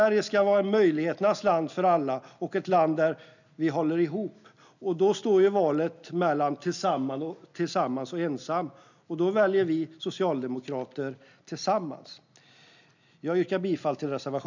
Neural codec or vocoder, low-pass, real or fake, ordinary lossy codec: none; 7.2 kHz; real; none